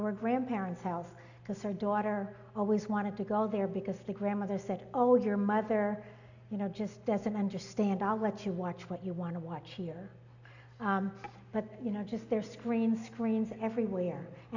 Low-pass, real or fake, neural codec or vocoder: 7.2 kHz; real; none